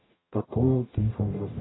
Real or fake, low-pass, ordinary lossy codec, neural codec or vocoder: fake; 7.2 kHz; AAC, 16 kbps; codec, 44.1 kHz, 0.9 kbps, DAC